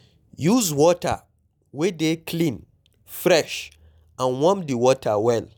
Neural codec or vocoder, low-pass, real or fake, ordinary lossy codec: none; 19.8 kHz; real; none